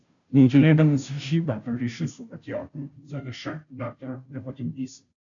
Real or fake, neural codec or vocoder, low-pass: fake; codec, 16 kHz, 0.5 kbps, FunCodec, trained on Chinese and English, 25 frames a second; 7.2 kHz